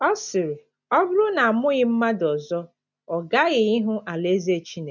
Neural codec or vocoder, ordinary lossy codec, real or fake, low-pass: none; none; real; 7.2 kHz